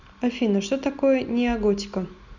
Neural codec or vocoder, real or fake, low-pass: none; real; 7.2 kHz